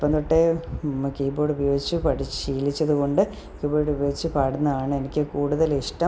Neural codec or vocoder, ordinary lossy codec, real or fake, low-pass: none; none; real; none